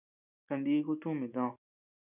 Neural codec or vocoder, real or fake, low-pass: none; real; 3.6 kHz